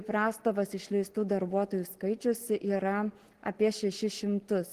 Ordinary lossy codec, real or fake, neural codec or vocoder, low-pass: Opus, 16 kbps; real; none; 14.4 kHz